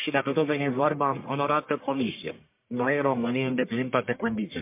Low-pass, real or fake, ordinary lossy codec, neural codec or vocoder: 3.6 kHz; fake; MP3, 32 kbps; codec, 44.1 kHz, 1.7 kbps, Pupu-Codec